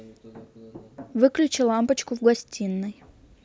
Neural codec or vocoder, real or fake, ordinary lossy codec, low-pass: none; real; none; none